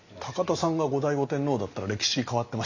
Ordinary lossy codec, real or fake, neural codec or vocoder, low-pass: Opus, 64 kbps; real; none; 7.2 kHz